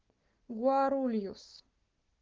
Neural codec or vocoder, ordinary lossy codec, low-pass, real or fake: codec, 44.1 kHz, 7.8 kbps, Pupu-Codec; Opus, 16 kbps; 7.2 kHz; fake